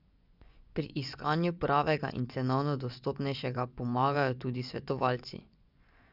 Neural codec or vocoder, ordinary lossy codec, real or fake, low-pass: vocoder, 24 kHz, 100 mel bands, Vocos; none; fake; 5.4 kHz